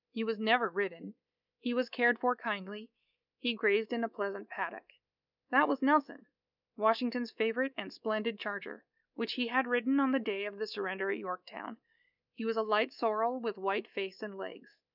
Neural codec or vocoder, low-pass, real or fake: codec, 24 kHz, 3.1 kbps, DualCodec; 5.4 kHz; fake